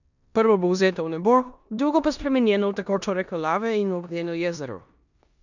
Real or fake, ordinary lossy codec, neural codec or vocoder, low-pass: fake; none; codec, 16 kHz in and 24 kHz out, 0.9 kbps, LongCat-Audio-Codec, four codebook decoder; 7.2 kHz